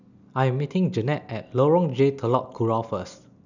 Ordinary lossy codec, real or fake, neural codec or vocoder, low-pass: none; real; none; 7.2 kHz